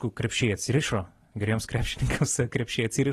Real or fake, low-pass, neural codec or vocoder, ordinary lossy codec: real; 19.8 kHz; none; AAC, 32 kbps